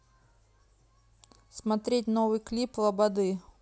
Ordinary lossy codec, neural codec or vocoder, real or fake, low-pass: none; none; real; none